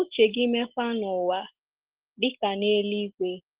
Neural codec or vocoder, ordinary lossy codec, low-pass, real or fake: none; Opus, 16 kbps; 3.6 kHz; real